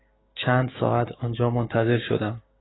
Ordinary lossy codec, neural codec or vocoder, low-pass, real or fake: AAC, 16 kbps; codec, 16 kHz, 6 kbps, DAC; 7.2 kHz; fake